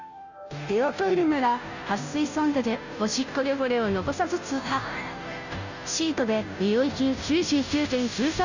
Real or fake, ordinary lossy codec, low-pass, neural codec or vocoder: fake; none; 7.2 kHz; codec, 16 kHz, 0.5 kbps, FunCodec, trained on Chinese and English, 25 frames a second